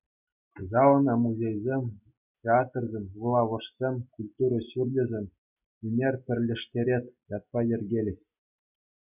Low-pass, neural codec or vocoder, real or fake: 3.6 kHz; none; real